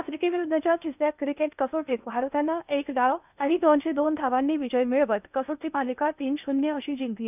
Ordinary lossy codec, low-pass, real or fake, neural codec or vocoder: none; 3.6 kHz; fake; codec, 16 kHz in and 24 kHz out, 0.8 kbps, FocalCodec, streaming, 65536 codes